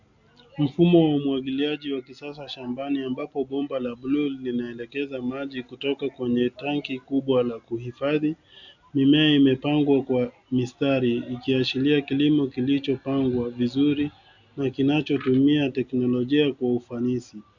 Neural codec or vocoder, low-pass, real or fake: none; 7.2 kHz; real